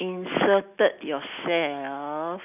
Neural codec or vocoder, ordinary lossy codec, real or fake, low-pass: none; none; real; 3.6 kHz